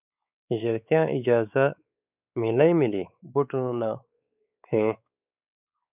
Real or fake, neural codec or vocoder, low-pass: fake; codec, 16 kHz, 4 kbps, X-Codec, WavLM features, trained on Multilingual LibriSpeech; 3.6 kHz